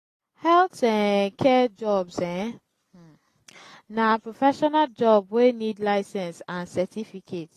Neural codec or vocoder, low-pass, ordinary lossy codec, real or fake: none; 14.4 kHz; AAC, 48 kbps; real